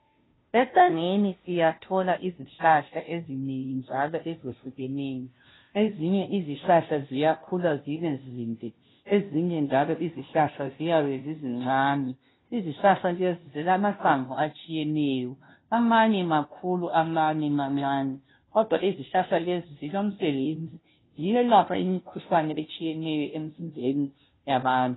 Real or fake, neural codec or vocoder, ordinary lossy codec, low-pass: fake; codec, 16 kHz, 0.5 kbps, FunCodec, trained on Chinese and English, 25 frames a second; AAC, 16 kbps; 7.2 kHz